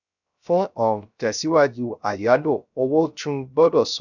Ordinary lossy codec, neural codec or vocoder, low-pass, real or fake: none; codec, 16 kHz, 0.3 kbps, FocalCodec; 7.2 kHz; fake